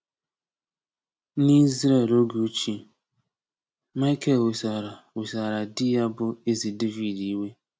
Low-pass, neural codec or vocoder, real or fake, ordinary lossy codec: none; none; real; none